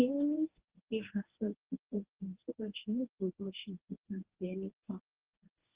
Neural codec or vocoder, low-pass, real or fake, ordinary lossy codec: codec, 24 kHz, 0.9 kbps, WavTokenizer, medium speech release version 1; 3.6 kHz; fake; Opus, 16 kbps